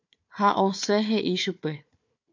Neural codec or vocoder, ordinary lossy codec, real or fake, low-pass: codec, 16 kHz, 4 kbps, FunCodec, trained on Chinese and English, 50 frames a second; MP3, 48 kbps; fake; 7.2 kHz